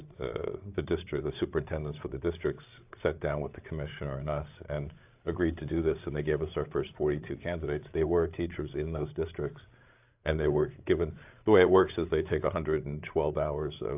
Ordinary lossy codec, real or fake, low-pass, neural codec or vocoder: AAC, 32 kbps; fake; 3.6 kHz; codec, 16 kHz, 8 kbps, FreqCodec, larger model